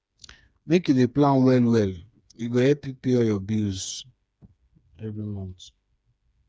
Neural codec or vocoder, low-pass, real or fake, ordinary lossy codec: codec, 16 kHz, 4 kbps, FreqCodec, smaller model; none; fake; none